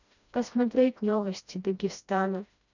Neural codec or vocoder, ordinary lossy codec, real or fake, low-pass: codec, 16 kHz, 1 kbps, FreqCodec, smaller model; none; fake; 7.2 kHz